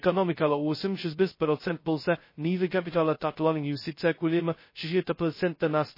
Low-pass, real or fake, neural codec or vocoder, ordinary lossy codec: 5.4 kHz; fake; codec, 16 kHz, 0.2 kbps, FocalCodec; MP3, 24 kbps